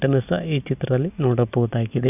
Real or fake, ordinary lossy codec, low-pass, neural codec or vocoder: real; none; 3.6 kHz; none